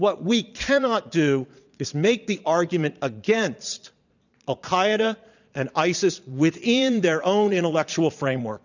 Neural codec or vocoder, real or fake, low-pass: vocoder, 22.05 kHz, 80 mel bands, Vocos; fake; 7.2 kHz